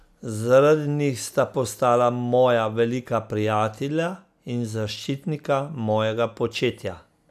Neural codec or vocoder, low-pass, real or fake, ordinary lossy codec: none; 14.4 kHz; real; none